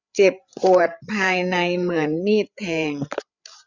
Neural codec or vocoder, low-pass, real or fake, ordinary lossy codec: codec, 16 kHz, 8 kbps, FreqCodec, larger model; 7.2 kHz; fake; none